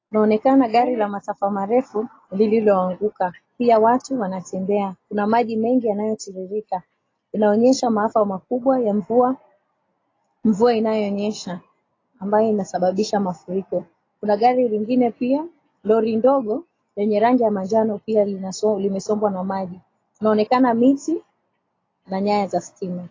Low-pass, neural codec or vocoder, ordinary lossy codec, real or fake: 7.2 kHz; none; AAC, 32 kbps; real